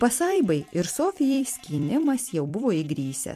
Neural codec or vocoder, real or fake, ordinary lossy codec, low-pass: vocoder, 44.1 kHz, 128 mel bands every 256 samples, BigVGAN v2; fake; MP3, 64 kbps; 14.4 kHz